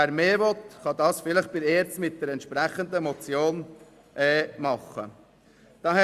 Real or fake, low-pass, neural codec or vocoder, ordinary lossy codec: real; 14.4 kHz; none; Opus, 64 kbps